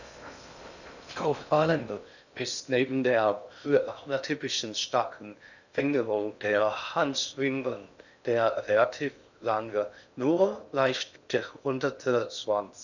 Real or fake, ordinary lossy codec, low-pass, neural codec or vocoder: fake; none; 7.2 kHz; codec, 16 kHz in and 24 kHz out, 0.6 kbps, FocalCodec, streaming, 2048 codes